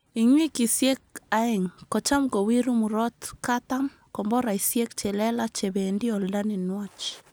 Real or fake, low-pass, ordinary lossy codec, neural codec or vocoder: real; none; none; none